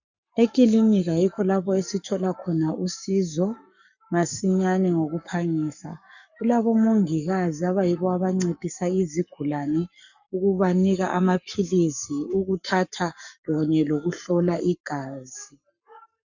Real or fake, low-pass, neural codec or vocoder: fake; 7.2 kHz; codec, 44.1 kHz, 7.8 kbps, Pupu-Codec